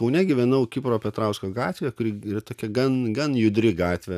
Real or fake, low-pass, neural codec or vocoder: real; 14.4 kHz; none